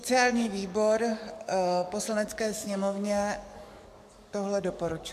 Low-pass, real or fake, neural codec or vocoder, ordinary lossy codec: 14.4 kHz; fake; codec, 44.1 kHz, 7.8 kbps, DAC; MP3, 96 kbps